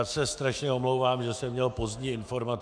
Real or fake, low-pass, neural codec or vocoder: fake; 9.9 kHz; autoencoder, 48 kHz, 128 numbers a frame, DAC-VAE, trained on Japanese speech